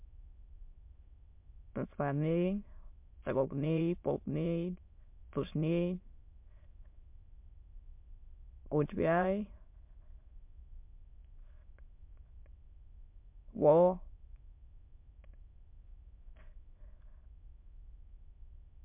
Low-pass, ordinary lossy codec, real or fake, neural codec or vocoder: 3.6 kHz; MP3, 32 kbps; fake; autoencoder, 22.05 kHz, a latent of 192 numbers a frame, VITS, trained on many speakers